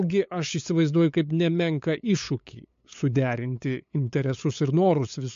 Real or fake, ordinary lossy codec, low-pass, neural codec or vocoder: fake; MP3, 48 kbps; 7.2 kHz; codec, 16 kHz, 8 kbps, FunCodec, trained on LibriTTS, 25 frames a second